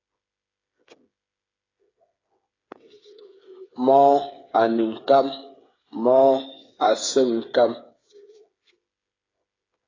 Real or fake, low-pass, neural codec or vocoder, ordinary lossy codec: fake; 7.2 kHz; codec, 16 kHz, 8 kbps, FreqCodec, smaller model; AAC, 32 kbps